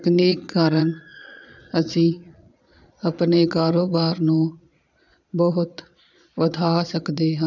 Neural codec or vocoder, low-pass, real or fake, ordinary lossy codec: vocoder, 22.05 kHz, 80 mel bands, Vocos; 7.2 kHz; fake; none